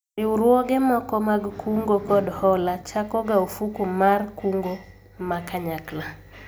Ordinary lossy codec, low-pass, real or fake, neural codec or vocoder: none; none; real; none